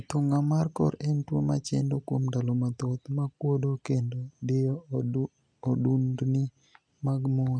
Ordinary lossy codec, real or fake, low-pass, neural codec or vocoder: none; real; 9.9 kHz; none